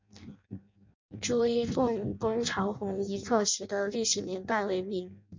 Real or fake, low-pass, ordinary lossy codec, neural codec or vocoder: fake; 7.2 kHz; MP3, 64 kbps; codec, 16 kHz in and 24 kHz out, 0.6 kbps, FireRedTTS-2 codec